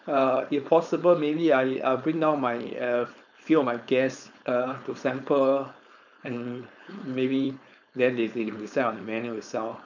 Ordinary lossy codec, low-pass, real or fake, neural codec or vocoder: none; 7.2 kHz; fake; codec, 16 kHz, 4.8 kbps, FACodec